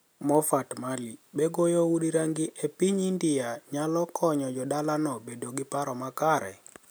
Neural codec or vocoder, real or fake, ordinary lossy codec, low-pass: none; real; none; none